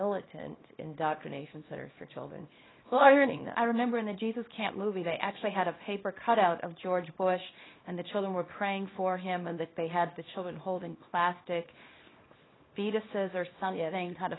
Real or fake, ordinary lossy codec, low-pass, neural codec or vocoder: fake; AAC, 16 kbps; 7.2 kHz; codec, 24 kHz, 0.9 kbps, WavTokenizer, small release